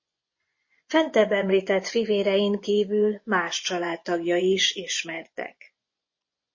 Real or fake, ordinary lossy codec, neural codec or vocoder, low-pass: fake; MP3, 32 kbps; vocoder, 24 kHz, 100 mel bands, Vocos; 7.2 kHz